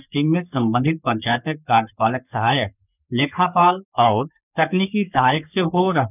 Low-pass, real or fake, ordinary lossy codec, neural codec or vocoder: 3.6 kHz; fake; none; codec, 44.1 kHz, 3.4 kbps, Pupu-Codec